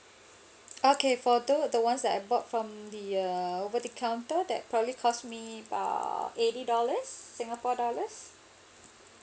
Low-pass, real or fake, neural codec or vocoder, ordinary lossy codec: none; real; none; none